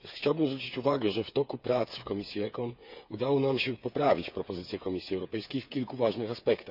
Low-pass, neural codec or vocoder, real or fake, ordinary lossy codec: 5.4 kHz; codec, 16 kHz, 8 kbps, FreqCodec, smaller model; fake; none